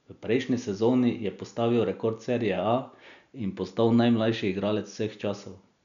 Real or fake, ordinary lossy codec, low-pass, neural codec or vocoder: real; none; 7.2 kHz; none